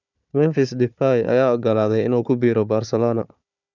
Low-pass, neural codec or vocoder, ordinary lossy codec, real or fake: 7.2 kHz; codec, 16 kHz, 4 kbps, FunCodec, trained on Chinese and English, 50 frames a second; none; fake